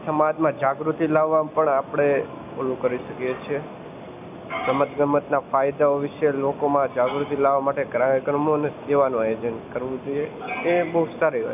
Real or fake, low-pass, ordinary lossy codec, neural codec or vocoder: real; 3.6 kHz; none; none